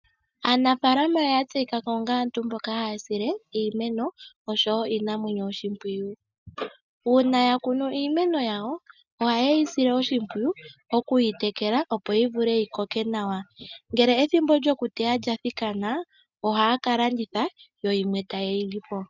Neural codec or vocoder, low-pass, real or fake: none; 7.2 kHz; real